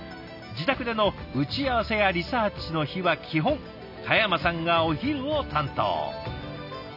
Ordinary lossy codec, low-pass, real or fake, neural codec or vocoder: none; 5.4 kHz; real; none